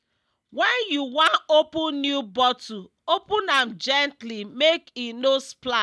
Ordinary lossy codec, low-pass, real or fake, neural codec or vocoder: none; none; real; none